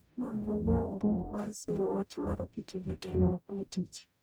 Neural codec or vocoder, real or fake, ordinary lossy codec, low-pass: codec, 44.1 kHz, 0.9 kbps, DAC; fake; none; none